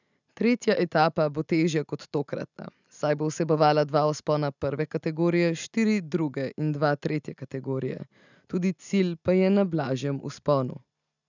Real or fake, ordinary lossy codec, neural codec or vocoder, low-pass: real; none; none; 7.2 kHz